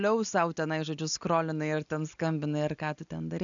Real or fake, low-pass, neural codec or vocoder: real; 7.2 kHz; none